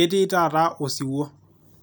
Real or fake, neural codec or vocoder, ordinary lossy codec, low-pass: real; none; none; none